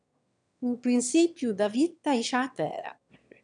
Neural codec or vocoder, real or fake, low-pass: autoencoder, 22.05 kHz, a latent of 192 numbers a frame, VITS, trained on one speaker; fake; 9.9 kHz